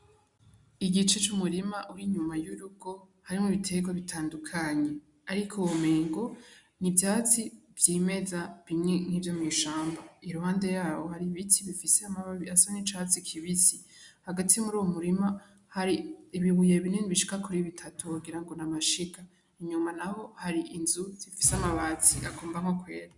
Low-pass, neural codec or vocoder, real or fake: 10.8 kHz; none; real